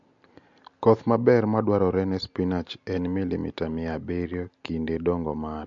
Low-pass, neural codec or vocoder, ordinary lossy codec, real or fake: 7.2 kHz; none; MP3, 48 kbps; real